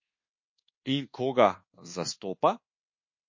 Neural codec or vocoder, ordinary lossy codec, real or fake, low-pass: codec, 24 kHz, 1.2 kbps, DualCodec; MP3, 32 kbps; fake; 7.2 kHz